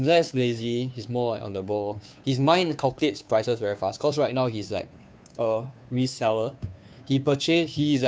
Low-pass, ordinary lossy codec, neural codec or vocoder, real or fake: none; none; codec, 16 kHz, 2 kbps, FunCodec, trained on Chinese and English, 25 frames a second; fake